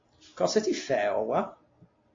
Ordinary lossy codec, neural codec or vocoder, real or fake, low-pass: AAC, 32 kbps; none; real; 7.2 kHz